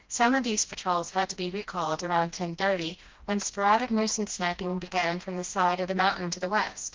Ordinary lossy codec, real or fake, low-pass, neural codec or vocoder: Opus, 32 kbps; fake; 7.2 kHz; codec, 16 kHz, 1 kbps, FreqCodec, smaller model